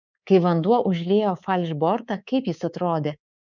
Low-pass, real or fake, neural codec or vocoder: 7.2 kHz; fake; codec, 24 kHz, 3.1 kbps, DualCodec